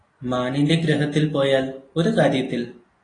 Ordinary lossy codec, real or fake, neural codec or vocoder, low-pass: AAC, 32 kbps; real; none; 9.9 kHz